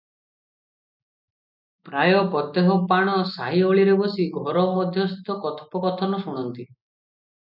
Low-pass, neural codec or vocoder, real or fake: 5.4 kHz; none; real